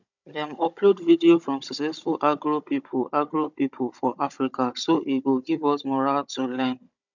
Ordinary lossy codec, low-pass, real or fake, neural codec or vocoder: none; 7.2 kHz; fake; codec, 16 kHz, 16 kbps, FunCodec, trained on Chinese and English, 50 frames a second